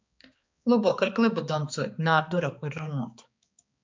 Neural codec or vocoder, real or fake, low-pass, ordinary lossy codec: codec, 16 kHz, 2 kbps, X-Codec, HuBERT features, trained on balanced general audio; fake; 7.2 kHz; MP3, 64 kbps